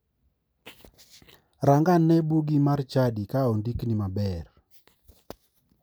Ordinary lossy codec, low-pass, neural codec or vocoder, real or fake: none; none; none; real